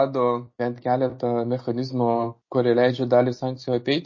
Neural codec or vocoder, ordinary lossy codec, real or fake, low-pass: none; MP3, 32 kbps; real; 7.2 kHz